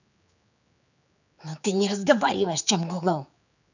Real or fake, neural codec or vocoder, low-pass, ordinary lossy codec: fake; codec, 16 kHz, 4 kbps, X-Codec, HuBERT features, trained on general audio; 7.2 kHz; none